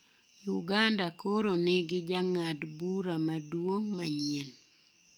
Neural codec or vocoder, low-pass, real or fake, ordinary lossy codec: codec, 44.1 kHz, 7.8 kbps, DAC; none; fake; none